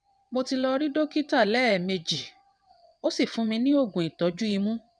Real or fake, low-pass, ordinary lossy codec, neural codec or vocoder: fake; 9.9 kHz; none; vocoder, 22.05 kHz, 80 mel bands, WaveNeXt